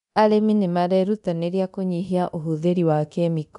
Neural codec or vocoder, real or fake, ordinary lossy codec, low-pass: codec, 24 kHz, 0.9 kbps, DualCodec; fake; none; 10.8 kHz